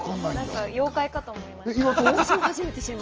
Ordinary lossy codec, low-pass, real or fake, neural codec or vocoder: Opus, 24 kbps; 7.2 kHz; real; none